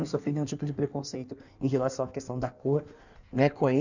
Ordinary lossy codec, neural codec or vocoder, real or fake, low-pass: none; codec, 16 kHz in and 24 kHz out, 1.1 kbps, FireRedTTS-2 codec; fake; 7.2 kHz